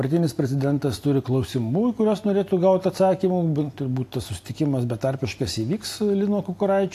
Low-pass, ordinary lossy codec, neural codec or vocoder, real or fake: 14.4 kHz; AAC, 64 kbps; none; real